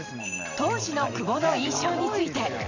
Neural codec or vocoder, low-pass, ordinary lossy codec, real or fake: vocoder, 44.1 kHz, 128 mel bands every 512 samples, BigVGAN v2; 7.2 kHz; none; fake